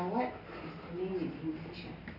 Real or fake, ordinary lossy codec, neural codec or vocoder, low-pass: real; none; none; 5.4 kHz